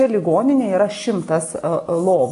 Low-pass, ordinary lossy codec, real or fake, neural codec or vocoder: 10.8 kHz; AAC, 64 kbps; real; none